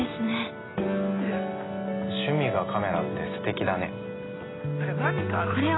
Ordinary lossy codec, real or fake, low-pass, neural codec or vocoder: AAC, 16 kbps; real; 7.2 kHz; none